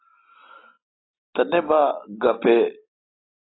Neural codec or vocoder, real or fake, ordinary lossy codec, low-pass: none; real; AAC, 16 kbps; 7.2 kHz